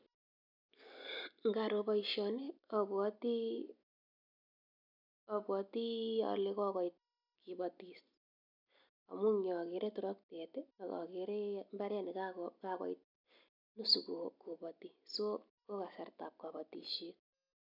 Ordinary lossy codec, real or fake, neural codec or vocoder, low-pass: none; real; none; 5.4 kHz